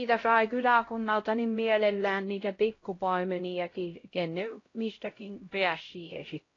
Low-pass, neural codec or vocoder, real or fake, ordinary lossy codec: 7.2 kHz; codec, 16 kHz, 0.5 kbps, X-Codec, HuBERT features, trained on LibriSpeech; fake; AAC, 32 kbps